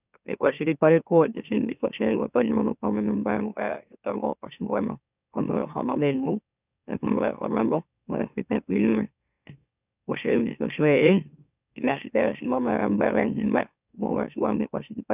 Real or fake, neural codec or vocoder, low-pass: fake; autoencoder, 44.1 kHz, a latent of 192 numbers a frame, MeloTTS; 3.6 kHz